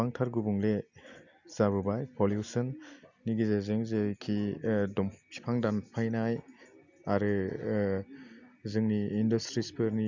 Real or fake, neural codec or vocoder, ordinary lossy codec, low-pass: real; none; none; 7.2 kHz